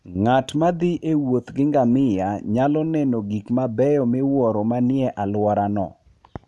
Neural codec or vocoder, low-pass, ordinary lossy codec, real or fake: none; none; none; real